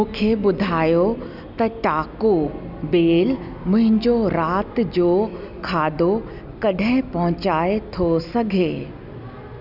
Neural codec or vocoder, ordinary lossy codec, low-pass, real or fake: none; none; 5.4 kHz; real